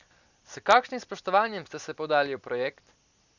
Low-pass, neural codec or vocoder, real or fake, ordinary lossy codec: 7.2 kHz; none; real; none